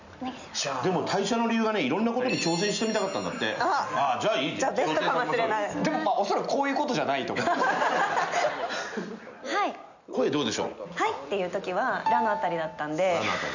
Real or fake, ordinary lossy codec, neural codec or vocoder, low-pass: real; none; none; 7.2 kHz